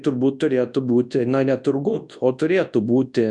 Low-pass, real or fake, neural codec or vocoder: 10.8 kHz; fake; codec, 24 kHz, 0.9 kbps, WavTokenizer, large speech release